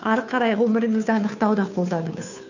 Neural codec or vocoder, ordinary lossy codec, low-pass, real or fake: codec, 16 kHz, 2 kbps, FunCodec, trained on Chinese and English, 25 frames a second; none; 7.2 kHz; fake